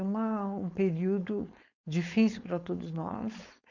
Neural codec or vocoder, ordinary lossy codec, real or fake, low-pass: codec, 16 kHz, 4.8 kbps, FACodec; none; fake; 7.2 kHz